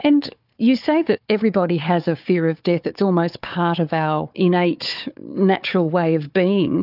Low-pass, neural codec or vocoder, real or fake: 5.4 kHz; codec, 16 kHz, 4 kbps, FunCodec, trained on Chinese and English, 50 frames a second; fake